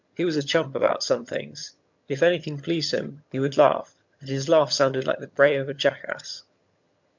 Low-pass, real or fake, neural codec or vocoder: 7.2 kHz; fake; vocoder, 22.05 kHz, 80 mel bands, HiFi-GAN